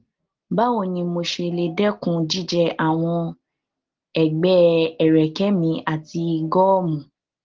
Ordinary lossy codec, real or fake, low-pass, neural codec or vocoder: Opus, 16 kbps; real; 7.2 kHz; none